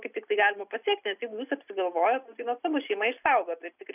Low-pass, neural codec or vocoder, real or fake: 3.6 kHz; none; real